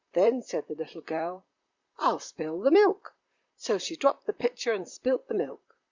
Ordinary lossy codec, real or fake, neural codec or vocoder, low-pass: Opus, 64 kbps; fake; vocoder, 44.1 kHz, 128 mel bands, Pupu-Vocoder; 7.2 kHz